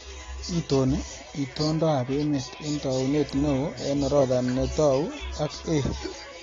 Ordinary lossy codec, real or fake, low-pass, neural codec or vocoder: AAC, 32 kbps; real; 7.2 kHz; none